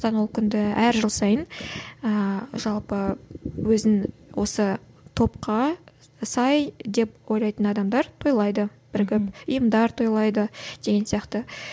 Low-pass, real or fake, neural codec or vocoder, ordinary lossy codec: none; real; none; none